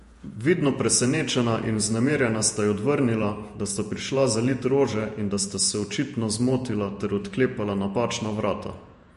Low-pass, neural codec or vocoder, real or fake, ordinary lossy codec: 14.4 kHz; vocoder, 48 kHz, 128 mel bands, Vocos; fake; MP3, 48 kbps